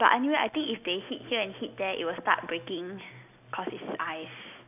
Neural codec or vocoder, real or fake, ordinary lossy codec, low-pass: none; real; none; 3.6 kHz